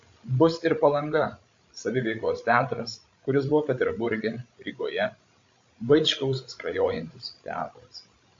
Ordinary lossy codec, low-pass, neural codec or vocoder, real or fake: AAC, 48 kbps; 7.2 kHz; codec, 16 kHz, 16 kbps, FreqCodec, larger model; fake